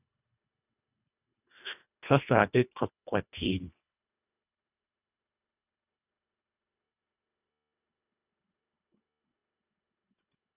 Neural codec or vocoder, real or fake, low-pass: codec, 24 kHz, 1.5 kbps, HILCodec; fake; 3.6 kHz